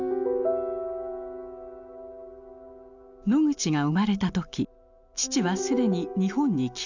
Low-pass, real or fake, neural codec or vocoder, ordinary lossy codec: 7.2 kHz; real; none; none